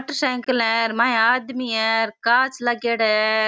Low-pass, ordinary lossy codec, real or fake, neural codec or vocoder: none; none; real; none